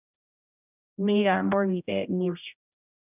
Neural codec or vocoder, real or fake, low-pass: codec, 16 kHz, 0.5 kbps, X-Codec, HuBERT features, trained on general audio; fake; 3.6 kHz